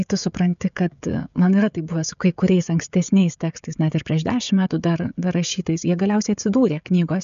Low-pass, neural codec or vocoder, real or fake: 7.2 kHz; codec, 16 kHz, 16 kbps, FreqCodec, smaller model; fake